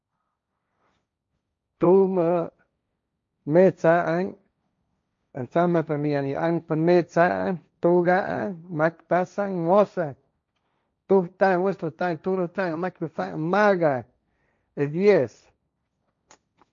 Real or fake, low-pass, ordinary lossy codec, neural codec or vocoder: fake; 7.2 kHz; MP3, 48 kbps; codec, 16 kHz, 1.1 kbps, Voila-Tokenizer